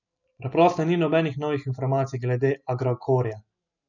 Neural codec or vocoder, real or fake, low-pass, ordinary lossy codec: none; real; 7.2 kHz; none